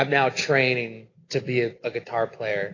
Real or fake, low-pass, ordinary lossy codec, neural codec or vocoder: real; 7.2 kHz; AAC, 32 kbps; none